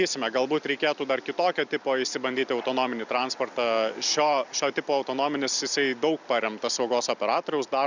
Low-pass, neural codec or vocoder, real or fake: 7.2 kHz; none; real